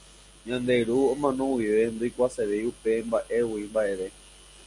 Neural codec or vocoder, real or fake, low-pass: none; real; 10.8 kHz